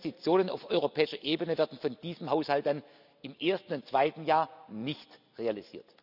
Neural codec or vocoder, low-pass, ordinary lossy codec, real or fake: none; 5.4 kHz; none; real